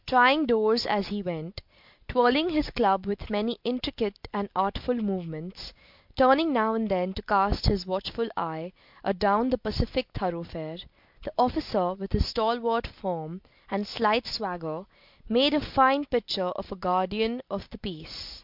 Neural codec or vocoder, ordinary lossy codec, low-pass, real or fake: none; MP3, 48 kbps; 5.4 kHz; real